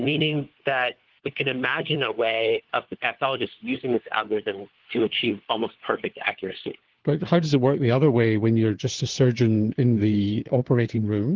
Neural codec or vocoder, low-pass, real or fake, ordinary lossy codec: codec, 16 kHz, 4 kbps, FunCodec, trained on LibriTTS, 50 frames a second; 7.2 kHz; fake; Opus, 16 kbps